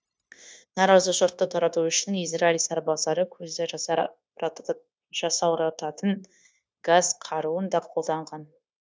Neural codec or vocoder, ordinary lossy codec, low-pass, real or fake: codec, 16 kHz, 0.9 kbps, LongCat-Audio-Codec; none; none; fake